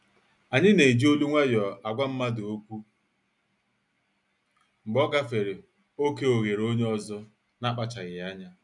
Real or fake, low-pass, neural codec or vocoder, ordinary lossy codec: real; 10.8 kHz; none; none